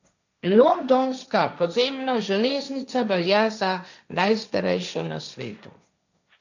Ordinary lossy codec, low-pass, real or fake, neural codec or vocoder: none; 7.2 kHz; fake; codec, 16 kHz, 1.1 kbps, Voila-Tokenizer